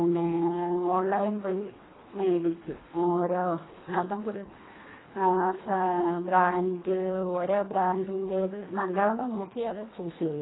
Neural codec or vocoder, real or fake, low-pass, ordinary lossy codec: codec, 24 kHz, 1.5 kbps, HILCodec; fake; 7.2 kHz; AAC, 16 kbps